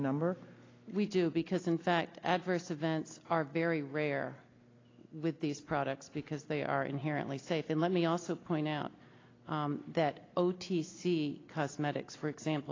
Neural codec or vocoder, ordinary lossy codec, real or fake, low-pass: none; AAC, 32 kbps; real; 7.2 kHz